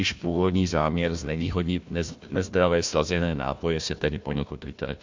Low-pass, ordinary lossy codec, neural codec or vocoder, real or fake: 7.2 kHz; MP3, 64 kbps; codec, 16 kHz, 1 kbps, FunCodec, trained on Chinese and English, 50 frames a second; fake